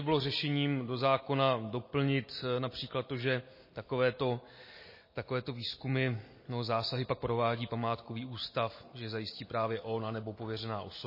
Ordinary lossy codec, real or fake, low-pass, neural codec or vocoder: MP3, 24 kbps; real; 5.4 kHz; none